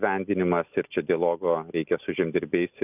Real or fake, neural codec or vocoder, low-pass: real; none; 3.6 kHz